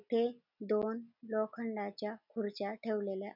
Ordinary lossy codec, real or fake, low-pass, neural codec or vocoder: none; real; 5.4 kHz; none